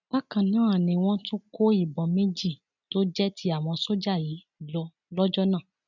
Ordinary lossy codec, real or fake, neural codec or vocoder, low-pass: none; real; none; 7.2 kHz